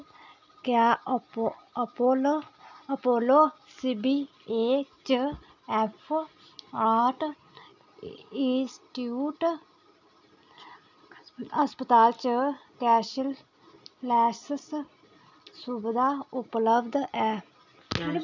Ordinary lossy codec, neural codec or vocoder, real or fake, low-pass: none; none; real; 7.2 kHz